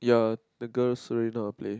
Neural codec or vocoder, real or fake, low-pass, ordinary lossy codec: none; real; none; none